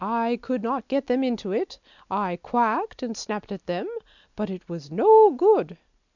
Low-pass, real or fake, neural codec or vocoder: 7.2 kHz; real; none